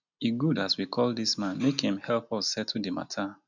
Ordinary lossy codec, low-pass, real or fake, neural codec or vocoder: none; 7.2 kHz; real; none